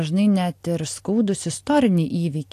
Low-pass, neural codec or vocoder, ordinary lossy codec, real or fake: 14.4 kHz; none; AAC, 64 kbps; real